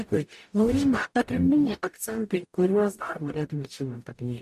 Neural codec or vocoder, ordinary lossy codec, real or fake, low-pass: codec, 44.1 kHz, 0.9 kbps, DAC; MP3, 64 kbps; fake; 14.4 kHz